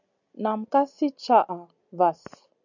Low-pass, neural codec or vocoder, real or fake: 7.2 kHz; none; real